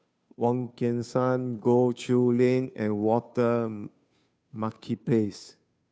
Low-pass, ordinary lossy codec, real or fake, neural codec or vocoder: none; none; fake; codec, 16 kHz, 2 kbps, FunCodec, trained on Chinese and English, 25 frames a second